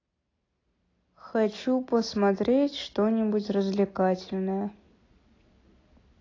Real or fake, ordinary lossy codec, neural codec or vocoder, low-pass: real; AAC, 32 kbps; none; 7.2 kHz